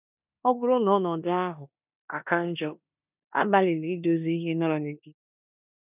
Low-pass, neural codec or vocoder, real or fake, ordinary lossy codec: 3.6 kHz; codec, 16 kHz in and 24 kHz out, 0.9 kbps, LongCat-Audio-Codec, four codebook decoder; fake; none